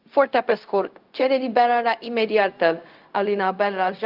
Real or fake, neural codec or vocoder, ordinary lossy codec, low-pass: fake; codec, 16 kHz, 0.4 kbps, LongCat-Audio-Codec; Opus, 32 kbps; 5.4 kHz